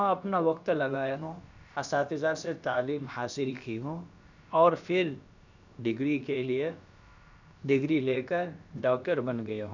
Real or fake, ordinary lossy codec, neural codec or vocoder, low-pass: fake; none; codec, 16 kHz, about 1 kbps, DyCAST, with the encoder's durations; 7.2 kHz